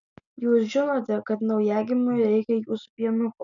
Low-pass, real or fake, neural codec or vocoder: 7.2 kHz; real; none